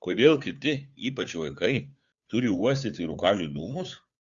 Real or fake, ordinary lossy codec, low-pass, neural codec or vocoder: fake; Opus, 64 kbps; 7.2 kHz; codec, 16 kHz, 2 kbps, FunCodec, trained on Chinese and English, 25 frames a second